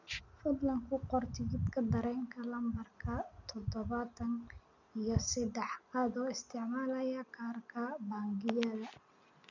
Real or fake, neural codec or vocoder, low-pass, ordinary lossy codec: real; none; 7.2 kHz; none